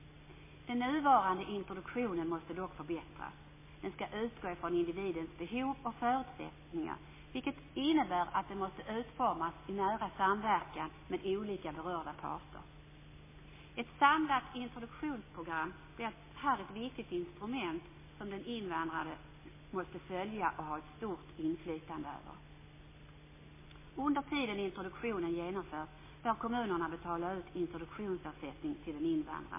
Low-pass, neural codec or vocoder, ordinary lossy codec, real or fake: 3.6 kHz; none; MP3, 16 kbps; real